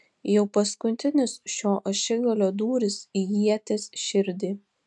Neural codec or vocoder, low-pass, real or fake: none; 10.8 kHz; real